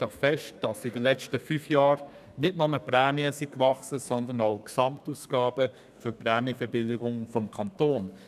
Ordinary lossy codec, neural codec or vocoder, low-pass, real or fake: none; codec, 32 kHz, 1.9 kbps, SNAC; 14.4 kHz; fake